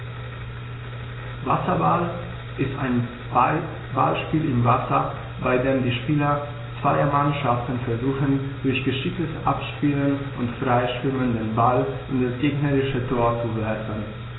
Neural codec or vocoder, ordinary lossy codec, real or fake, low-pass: none; AAC, 16 kbps; real; 7.2 kHz